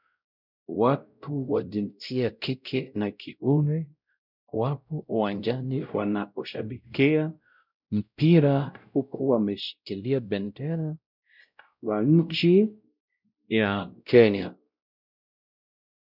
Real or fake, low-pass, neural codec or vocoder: fake; 5.4 kHz; codec, 16 kHz, 0.5 kbps, X-Codec, WavLM features, trained on Multilingual LibriSpeech